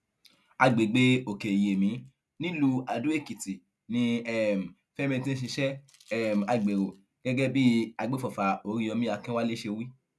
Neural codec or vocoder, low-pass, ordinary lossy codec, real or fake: none; none; none; real